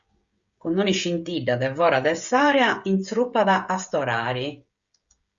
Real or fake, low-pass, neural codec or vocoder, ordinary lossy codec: fake; 7.2 kHz; codec, 16 kHz, 16 kbps, FreqCodec, smaller model; Opus, 64 kbps